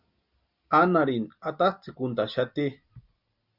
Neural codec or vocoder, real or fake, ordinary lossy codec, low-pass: none; real; Opus, 64 kbps; 5.4 kHz